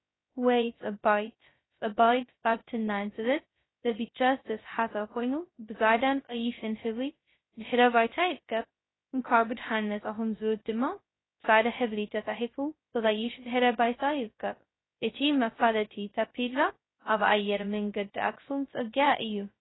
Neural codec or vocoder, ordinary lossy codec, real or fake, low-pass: codec, 16 kHz, 0.2 kbps, FocalCodec; AAC, 16 kbps; fake; 7.2 kHz